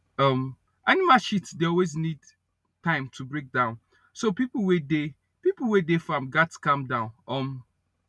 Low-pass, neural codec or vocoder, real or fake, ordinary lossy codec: none; none; real; none